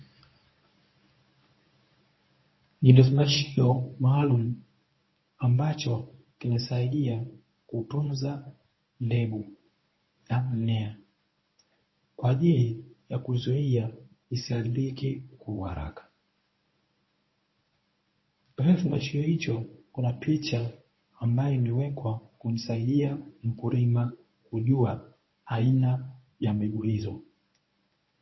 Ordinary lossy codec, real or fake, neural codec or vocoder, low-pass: MP3, 24 kbps; fake; codec, 24 kHz, 0.9 kbps, WavTokenizer, medium speech release version 1; 7.2 kHz